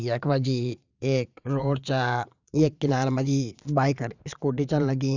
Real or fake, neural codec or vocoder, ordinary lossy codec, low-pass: fake; vocoder, 22.05 kHz, 80 mel bands, Vocos; none; 7.2 kHz